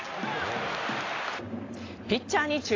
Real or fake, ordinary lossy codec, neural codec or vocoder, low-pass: real; AAC, 32 kbps; none; 7.2 kHz